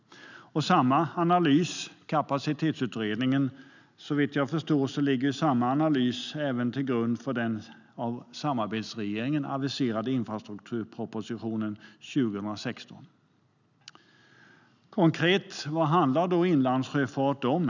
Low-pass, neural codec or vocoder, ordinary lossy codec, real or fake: 7.2 kHz; none; none; real